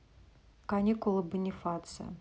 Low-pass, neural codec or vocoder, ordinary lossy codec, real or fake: none; none; none; real